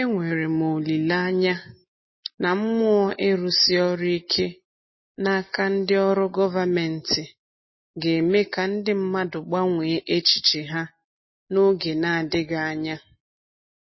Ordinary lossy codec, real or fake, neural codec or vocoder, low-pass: MP3, 24 kbps; real; none; 7.2 kHz